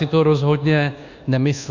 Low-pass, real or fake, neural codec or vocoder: 7.2 kHz; fake; codec, 24 kHz, 1.2 kbps, DualCodec